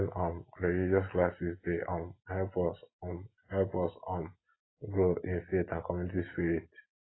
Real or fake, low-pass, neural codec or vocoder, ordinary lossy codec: real; 7.2 kHz; none; AAC, 16 kbps